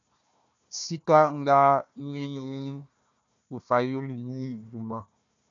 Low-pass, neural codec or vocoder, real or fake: 7.2 kHz; codec, 16 kHz, 1 kbps, FunCodec, trained on Chinese and English, 50 frames a second; fake